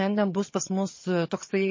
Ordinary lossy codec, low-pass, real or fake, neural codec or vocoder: MP3, 32 kbps; 7.2 kHz; fake; vocoder, 22.05 kHz, 80 mel bands, HiFi-GAN